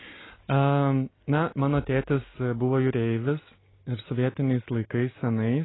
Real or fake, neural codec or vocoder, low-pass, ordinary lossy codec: real; none; 7.2 kHz; AAC, 16 kbps